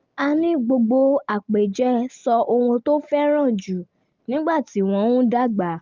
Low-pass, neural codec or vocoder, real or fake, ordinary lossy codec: 7.2 kHz; none; real; Opus, 24 kbps